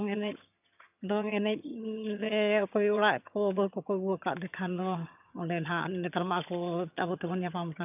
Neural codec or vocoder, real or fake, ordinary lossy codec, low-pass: vocoder, 22.05 kHz, 80 mel bands, HiFi-GAN; fake; none; 3.6 kHz